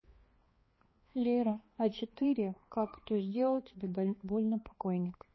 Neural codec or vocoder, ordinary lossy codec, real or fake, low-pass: codec, 16 kHz, 2 kbps, X-Codec, HuBERT features, trained on balanced general audio; MP3, 24 kbps; fake; 7.2 kHz